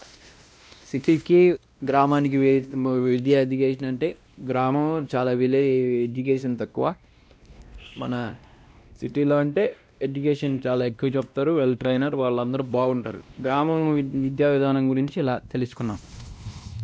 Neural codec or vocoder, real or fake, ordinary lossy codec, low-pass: codec, 16 kHz, 1 kbps, X-Codec, WavLM features, trained on Multilingual LibriSpeech; fake; none; none